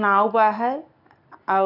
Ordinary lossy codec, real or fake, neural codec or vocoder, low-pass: none; real; none; 5.4 kHz